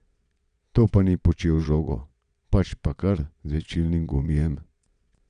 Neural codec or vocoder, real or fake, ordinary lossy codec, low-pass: vocoder, 22.05 kHz, 80 mel bands, Vocos; fake; none; 9.9 kHz